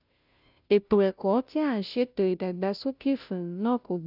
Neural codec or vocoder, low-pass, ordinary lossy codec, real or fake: codec, 16 kHz, 0.5 kbps, FunCodec, trained on Chinese and English, 25 frames a second; 5.4 kHz; none; fake